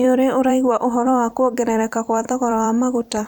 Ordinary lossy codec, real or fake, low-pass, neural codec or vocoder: none; fake; 19.8 kHz; vocoder, 44.1 kHz, 128 mel bands every 256 samples, BigVGAN v2